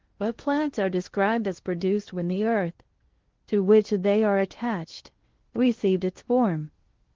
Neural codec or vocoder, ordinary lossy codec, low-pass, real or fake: codec, 16 kHz in and 24 kHz out, 0.6 kbps, FocalCodec, streaming, 2048 codes; Opus, 16 kbps; 7.2 kHz; fake